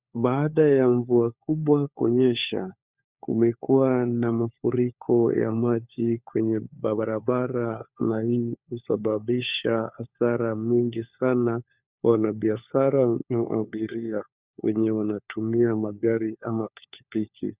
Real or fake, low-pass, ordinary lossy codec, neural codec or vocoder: fake; 3.6 kHz; Opus, 64 kbps; codec, 16 kHz, 4 kbps, FunCodec, trained on LibriTTS, 50 frames a second